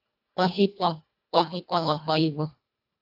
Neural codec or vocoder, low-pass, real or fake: codec, 24 kHz, 1.5 kbps, HILCodec; 5.4 kHz; fake